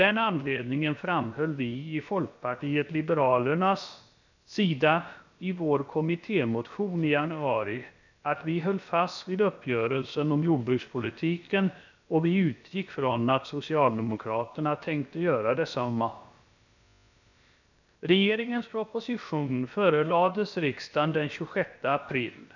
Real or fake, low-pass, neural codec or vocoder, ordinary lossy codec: fake; 7.2 kHz; codec, 16 kHz, about 1 kbps, DyCAST, with the encoder's durations; none